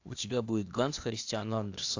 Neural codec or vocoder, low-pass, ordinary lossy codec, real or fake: codec, 16 kHz, 0.8 kbps, ZipCodec; 7.2 kHz; AAC, 48 kbps; fake